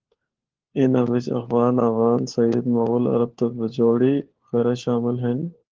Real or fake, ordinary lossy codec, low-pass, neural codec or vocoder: fake; Opus, 16 kbps; 7.2 kHz; codec, 16 kHz, 4 kbps, FunCodec, trained on LibriTTS, 50 frames a second